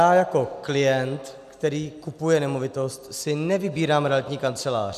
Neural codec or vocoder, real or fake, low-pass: none; real; 14.4 kHz